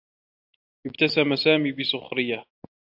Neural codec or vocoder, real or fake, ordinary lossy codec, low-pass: none; real; AAC, 48 kbps; 5.4 kHz